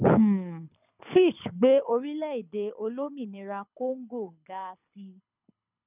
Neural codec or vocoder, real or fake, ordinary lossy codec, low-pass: codec, 24 kHz, 6 kbps, HILCodec; fake; none; 3.6 kHz